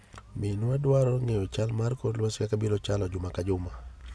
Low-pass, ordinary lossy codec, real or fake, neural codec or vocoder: none; none; real; none